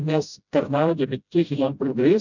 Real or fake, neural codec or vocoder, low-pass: fake; codec, 16 kHz, 0.5 kbps, FreqCodec, smaller model; 7.2 kHz